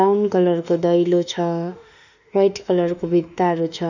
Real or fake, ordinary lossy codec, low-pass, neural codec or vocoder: fake; none; 7.2 kHz; autoencoder, 48 kHz, 32 numbers a frame, DAC-VAE, trained on Japanese speech